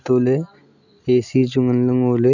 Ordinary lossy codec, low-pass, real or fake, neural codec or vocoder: none; 7.2 kHz; real; none